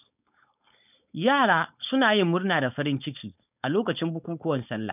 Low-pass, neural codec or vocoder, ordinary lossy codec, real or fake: 3.6 kHz; codec, 16 kHz, 4.8 kbps, FACodec; none; fake